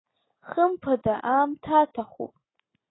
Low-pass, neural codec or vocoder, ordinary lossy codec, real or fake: 7.2 kHz; none; AAC, 16 kbps; real